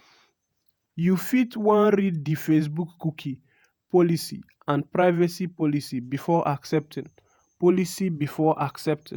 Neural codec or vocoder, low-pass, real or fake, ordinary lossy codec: vocoder, 48 kHz, 128 mel bands, Vocos; none; fake; none